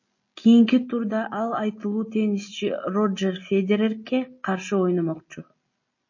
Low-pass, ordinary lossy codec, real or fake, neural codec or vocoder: 7.2 kHz; MP3, 32 kbps; real; none